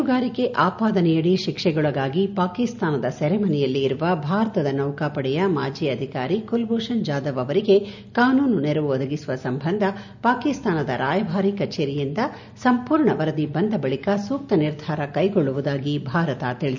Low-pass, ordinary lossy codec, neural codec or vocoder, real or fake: 7.2 kHz; none; none; real